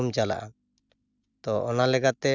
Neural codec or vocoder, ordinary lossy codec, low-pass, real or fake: none; none; 7.2 kHz; real